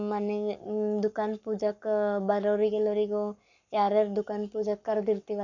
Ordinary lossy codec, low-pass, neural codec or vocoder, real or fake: none; 7.2 kHz; codec, 44.1 kHz, 7.8 kbps, Pupu-Codec; fake